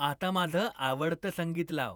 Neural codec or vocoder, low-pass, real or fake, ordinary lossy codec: vocoder, 48 kHz, 128 mel bands, Vocos; none; fake; none